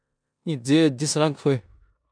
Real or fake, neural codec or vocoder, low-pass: fake; codec, 16 kHz in and 24 kHz out, 0.9 kbps, LongCat-Audio-Codec, four codebook decoder; 9.9 kHz